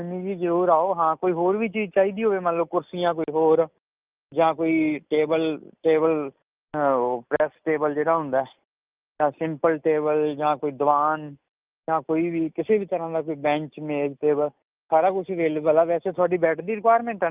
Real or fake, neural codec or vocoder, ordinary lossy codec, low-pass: real; none; Opus, 24 kbps; 3.6 kHz